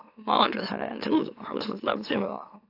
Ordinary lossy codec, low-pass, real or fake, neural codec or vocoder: AAC, 32 kbps; 5.4 kHz; fake; autoencoder, 44.1 kHz, a latent of 192 numbers a frame, MeloTTS